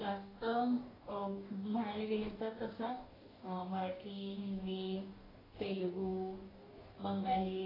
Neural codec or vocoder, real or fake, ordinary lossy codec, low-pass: codec, 44.1 kHz, 2.6 kbps, DAC; fake; AAC, 24 kbps; 5.4 kHz